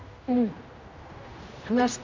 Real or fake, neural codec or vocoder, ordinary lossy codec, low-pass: fake; codec, 16 kHz, 1 kbps, X-Codec, HuBERT features, trained on general audio; MP3, 48 kbps; 7.2 kHz